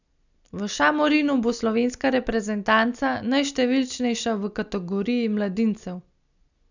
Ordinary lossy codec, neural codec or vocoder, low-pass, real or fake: none; none; 7.2 kHz; real